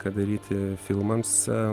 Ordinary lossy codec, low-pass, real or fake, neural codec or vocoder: Opus, 24 kbps; 14.4 kHz; real; none